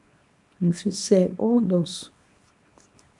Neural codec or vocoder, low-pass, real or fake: codec, 24 kHz, 0.9 kbps, WavTokenizer, small release; 10.8 kHz; fake